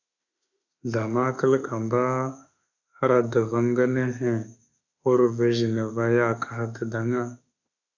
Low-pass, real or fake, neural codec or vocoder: 7.2 kHz; fake; autoencoder, 48 kHz, 32 numbers a frame, DAC-VAE, trained on Japanese speech